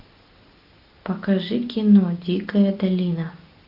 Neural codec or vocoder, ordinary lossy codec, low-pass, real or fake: none; AAC, 48 kbps; 5.4 kHz; real